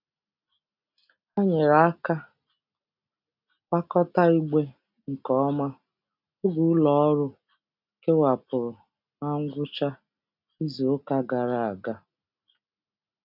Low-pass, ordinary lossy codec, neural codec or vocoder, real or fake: 5.4 kHz; none; none; real